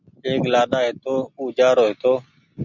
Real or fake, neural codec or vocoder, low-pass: real; none; 7.2 kHz